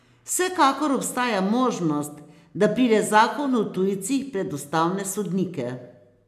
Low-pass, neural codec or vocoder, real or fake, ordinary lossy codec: 14.4 kHz; none; real; none